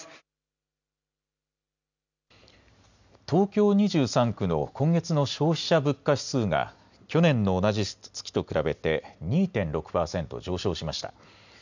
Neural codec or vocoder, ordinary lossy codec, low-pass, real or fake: none; none; 7.2 kHz; real